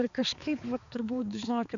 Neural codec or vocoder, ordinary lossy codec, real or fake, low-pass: codec, 16 kHz, 4 kbps, X-Codec, HuBERT features, trained on balanced general audio; MP3, 64 kbps; fake; 7.2 kHz